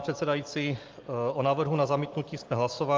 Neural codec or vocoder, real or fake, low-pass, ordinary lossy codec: none; real; 7.2 kHz; Opus, 32 kbps